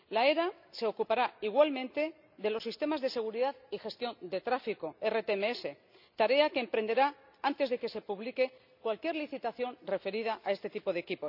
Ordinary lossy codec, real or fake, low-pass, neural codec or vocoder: none; real; 5.4 kHz; none